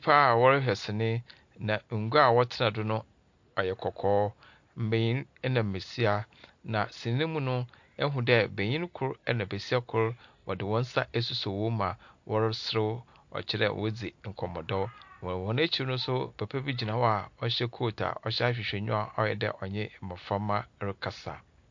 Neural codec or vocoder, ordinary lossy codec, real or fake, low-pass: none; MP3, 48 kbps; real; 7.2 kHz